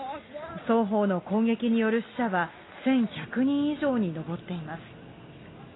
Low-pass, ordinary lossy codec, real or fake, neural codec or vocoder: 7.2 kHz; AAC, 16 kbps; real; none